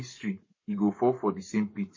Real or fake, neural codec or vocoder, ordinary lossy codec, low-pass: fake; codec, 44.1 kHz, 7.8 kbps, Pupu-Codec; MP3, 32 kbps; 7.2 kHz